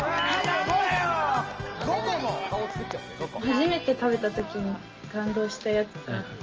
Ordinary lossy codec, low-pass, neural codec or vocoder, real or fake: Opus, 24 kbps; 7.2 kHz; none; real